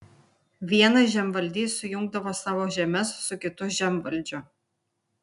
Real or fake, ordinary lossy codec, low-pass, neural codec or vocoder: real; MP3, 96 kbps; 10.8 kHz; none